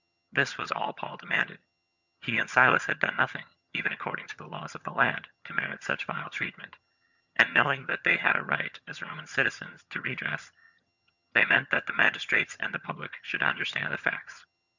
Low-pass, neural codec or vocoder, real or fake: 7.2 kHz; vocoder, 22.05 kHz, 80 mel bands, HiFi-GAN; fake